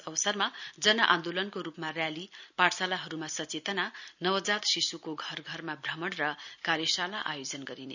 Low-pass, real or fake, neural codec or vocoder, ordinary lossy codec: 7.2 kHz; real; none; none